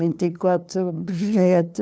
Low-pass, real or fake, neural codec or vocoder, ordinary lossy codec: none; fake; codec, 16 kHz, 2 kbps, FunCodec, trained on LibriTTS, 25 frames a second; none